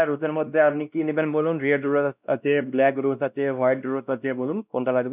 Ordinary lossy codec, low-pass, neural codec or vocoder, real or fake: none; 3.6 kHz; codec, 16 kHz, 1 kbps, X-Codec, WavLM features, trained on Multilingual LibriSpeech; fake